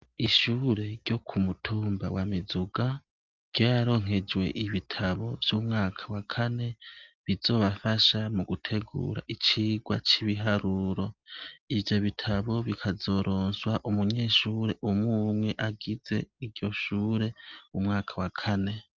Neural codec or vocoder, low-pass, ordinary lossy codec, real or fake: none; 7.2 kHz; Opus, 32 kbps; real